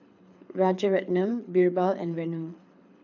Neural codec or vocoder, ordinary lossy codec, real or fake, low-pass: codec, 24 kHz, 6 kbps, HILCodec; none; fake; 7.2 kHz